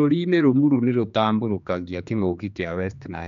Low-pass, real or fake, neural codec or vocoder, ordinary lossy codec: 7.2 kHz; fake; codec, 16 kHz, 2 kbps, X-Codec, HuBERT features, trained on general audio; none